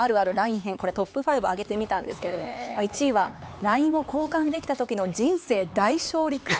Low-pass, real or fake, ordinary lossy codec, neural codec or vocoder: none; fake; none; codec, 16 kHz, 4 kbps, X-Codec, HuBERT features, trained on LibriSpeech